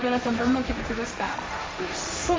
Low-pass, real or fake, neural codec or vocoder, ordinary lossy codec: none; fake; codec, 16 kHz, 1.1 kbps, Voila-Tokenizer; none